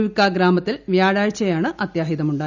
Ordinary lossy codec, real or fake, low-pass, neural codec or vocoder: none; real; 7.2 kHz; none